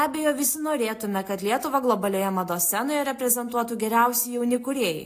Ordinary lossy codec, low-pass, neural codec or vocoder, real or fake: AAC, 64 kbps; 14.4 kHz; none; real